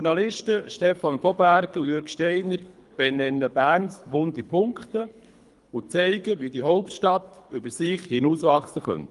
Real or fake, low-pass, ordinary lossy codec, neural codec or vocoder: fake; 10.8 kHz; Opus, 32 kbps; codec, 24 kHz, 3 kbps, HILCodec